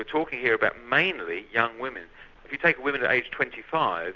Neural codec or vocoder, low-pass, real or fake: none; 7.2 kHz; real